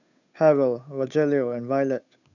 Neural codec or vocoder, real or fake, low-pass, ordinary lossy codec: codec, 16 kHz, 8 kbps, FunCodec, trained on Chinese and English, 25 frames a second; fake; 7.2 kHz; none